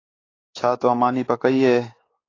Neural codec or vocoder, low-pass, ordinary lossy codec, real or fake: codec, 16 kHz, 4 kbps, X-Codec, WavLM features, trained on Multilingual LibriSpeech; 7.2 kHz; AAC, 32 kbps; fake